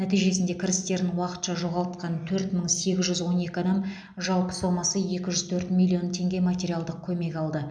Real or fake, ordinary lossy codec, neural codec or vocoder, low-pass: real; none; none; 9.9 kHz